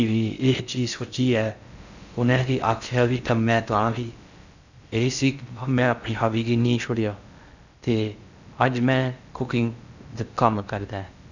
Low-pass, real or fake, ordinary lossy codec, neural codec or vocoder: 7.2 kHz; fake; none; codec, 16 kHz in and 24 kHz out, 0.6 kbps, FocalCodec, streaming, 4096 codes